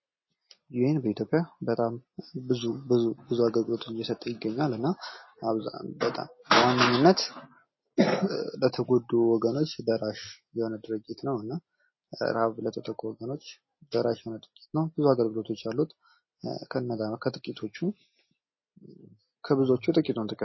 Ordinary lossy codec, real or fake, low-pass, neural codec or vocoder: MP3, 24 kbps; real; 7.2 kHz; none